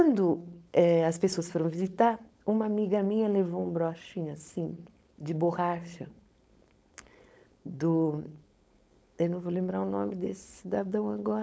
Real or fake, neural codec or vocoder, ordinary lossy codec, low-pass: fake; codec, 16 kHz, 4.8 kbps, FACodec; none; none